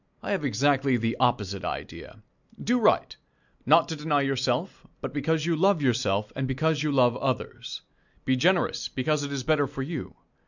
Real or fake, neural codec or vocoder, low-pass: real; none; 7.2 kHz